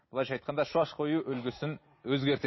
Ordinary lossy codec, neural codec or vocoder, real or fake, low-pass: MP3, 24 kbps; none; real; 7.2 kHz